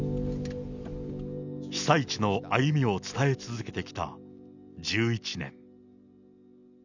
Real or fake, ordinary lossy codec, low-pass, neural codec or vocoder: real; none; 7.2 kHz; none